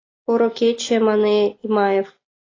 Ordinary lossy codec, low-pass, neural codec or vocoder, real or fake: AAC, 32 kbps; 7.2 kHz; none; real